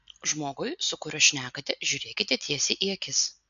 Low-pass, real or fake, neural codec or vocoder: 7.2 kHz; real; none